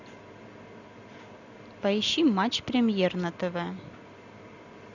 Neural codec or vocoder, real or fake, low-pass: none; real; 7.2 kHz